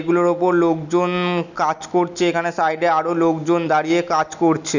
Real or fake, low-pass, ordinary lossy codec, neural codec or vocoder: real; 7.2 kHz; none; none